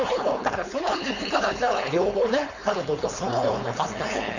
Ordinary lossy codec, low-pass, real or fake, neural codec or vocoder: none; 7.2 kHz; fake; codec, 16 kHz, 4.8 kbps, FACodec